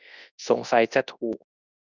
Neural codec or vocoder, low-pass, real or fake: codec, 24 kHz, 0.9 kbps, WavTokenizer, large speech release; 7.2 kHz; fake